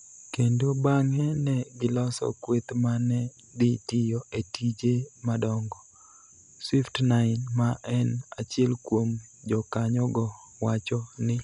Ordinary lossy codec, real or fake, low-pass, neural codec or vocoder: none; real; 10.8 kHz; none